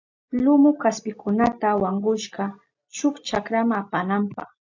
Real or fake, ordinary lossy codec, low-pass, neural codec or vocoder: real; AAC, 48 kbps; 7.2 kHz; none